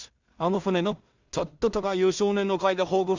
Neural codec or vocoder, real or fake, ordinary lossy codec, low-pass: codec, 16 kHz in and 24 kHz out, 0.4 kbps, LongCat-Audio-Codec, two codebook decoder; fake; Opus, 64 kbps; 7.2 kHz